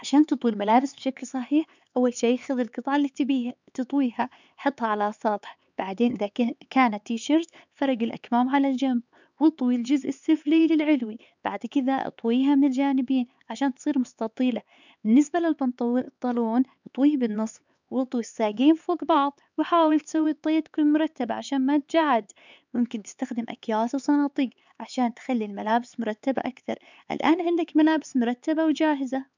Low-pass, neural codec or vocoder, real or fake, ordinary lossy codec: 7.2 kHz; codec, 16 kHz, 4 kbps, X-Codec, HuBERT features, trained on LibriSpeech; fake; none